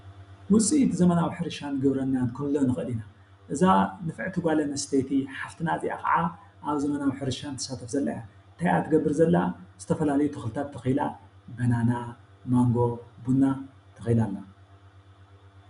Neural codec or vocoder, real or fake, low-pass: none; real; 10.8 kHz